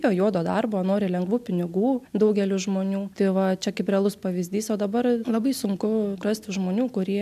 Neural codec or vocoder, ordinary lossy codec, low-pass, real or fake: none; MP3, 96 kbps; 14.4 kHz; real